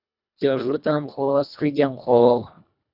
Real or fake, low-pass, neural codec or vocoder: fake; 5.4 kHz; codec, 24 kHz, 1.5 kbps, HILCodec